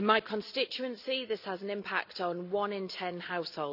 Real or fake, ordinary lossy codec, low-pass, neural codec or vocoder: real; none; 5.4 kHz; none